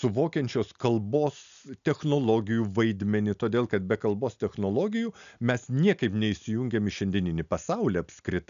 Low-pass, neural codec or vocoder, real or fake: 7.2 kHz; none; real